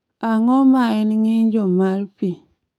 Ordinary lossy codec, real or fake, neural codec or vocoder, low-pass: none; fake; codec, 44.1 kHz, 7.8 kbps, DAC; 19.8 kHz